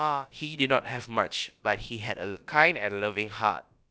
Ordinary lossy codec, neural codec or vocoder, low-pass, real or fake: none; codec, 16 kHz, about 1 kbps, DyCAST, with the encoder's durations; none; fake